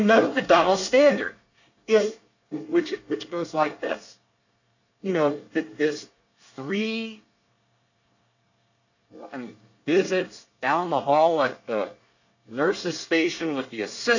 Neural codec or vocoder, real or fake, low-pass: codec, 24 kHz, 1 kbps, SNAC; fake; 7.2 kHz